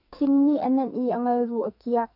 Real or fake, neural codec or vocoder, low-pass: fake; codec, 44.1 kHz, 2.6 kbps, SNAC; 5.4 kHz